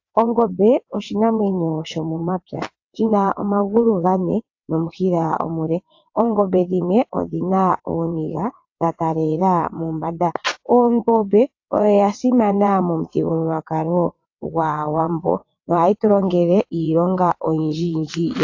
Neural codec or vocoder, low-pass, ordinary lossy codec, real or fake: vocoder, 22.05 kHz, 80 mel bands, WaveNeXt; 7.2 kHz; AAC, 48 kbps; fake